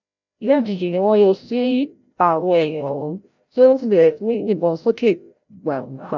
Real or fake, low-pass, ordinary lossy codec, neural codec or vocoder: fake; 7.2 kHz; none; codec, 16 kHz, 0.5 kbps, FreqCodec, larger model